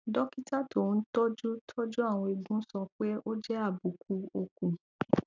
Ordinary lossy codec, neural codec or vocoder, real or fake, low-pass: none; none; real; 7.2 kHz